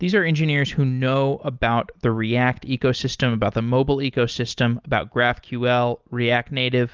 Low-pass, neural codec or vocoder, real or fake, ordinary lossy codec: 7.2 kHz; none; real; Opus, 32 kbps